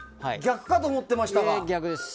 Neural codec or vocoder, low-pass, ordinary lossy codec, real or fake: none; none; none; real